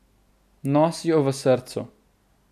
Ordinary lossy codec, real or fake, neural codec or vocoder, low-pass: none; real; none; 14.4 kHz